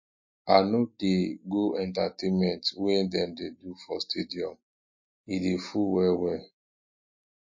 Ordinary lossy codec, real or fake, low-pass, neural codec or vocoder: MP3, 32 kbps; real; 7.2 kHz; none